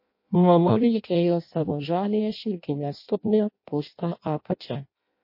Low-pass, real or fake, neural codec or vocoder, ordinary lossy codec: 5.4 kHz; fake; codec, 16 kHz in and 24 kHz out, 0.6 kbps, FireRedTTS-2 codec; MP3, 32 kbps